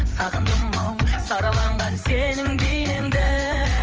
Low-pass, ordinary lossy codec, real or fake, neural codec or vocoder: 7.2 kHz; Opus, 24 kbps; fake; codec, 16 kHz, 8 kbps, FreqCodec, larger model